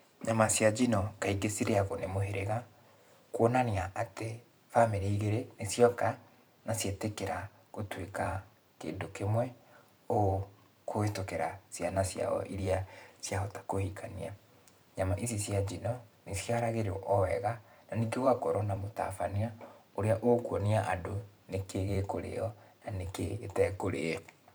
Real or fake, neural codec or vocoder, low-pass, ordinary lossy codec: fake; vocoder, 44.1 kHz, 128 mel bands every 512 samples, BigVGAN v2; none; none